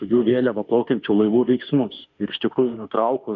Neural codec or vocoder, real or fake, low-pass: autoencoder, 48 kHz, 32 numbers a frame, DAC-VAE, trained on Japanese speech; fake; 7.2 kHz